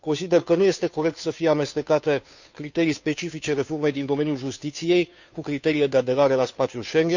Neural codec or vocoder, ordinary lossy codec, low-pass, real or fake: codec, 16 kHz, 2 kbps, FunCodec, trained on Chinese and English, 25 frames a second; none; 7.2 kHz; fake